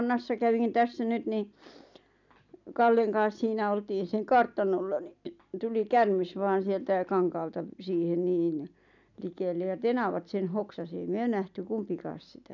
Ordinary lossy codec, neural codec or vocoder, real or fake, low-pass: none; none; real; 7.2 kHz